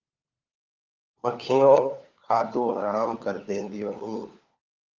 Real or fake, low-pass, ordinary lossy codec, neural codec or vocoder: fake; 7.2 kHz; Opus, 24 kbps; codec, 16 kHz, 4 kbps, FunCodec, trained on LibriTTS, 50 frames a second